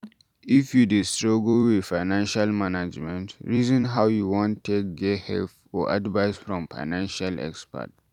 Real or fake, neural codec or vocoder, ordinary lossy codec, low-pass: fake; vocoder, 44.1 kHz, 128 mel bands every 256 samples, BigVGAN v2; none; 19.8 kHz